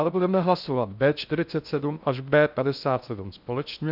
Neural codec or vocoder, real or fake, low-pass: codec, 16 kHz in and 24 kHz out, 0.6 kbps, FocalCodec, streaming, 4096 codes; fake; 5.4 kHz